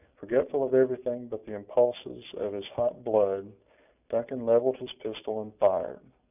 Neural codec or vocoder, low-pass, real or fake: none; 3.6 kHz; real